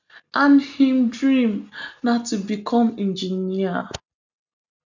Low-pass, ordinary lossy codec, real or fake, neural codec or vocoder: 7.2 kHz; none; real; none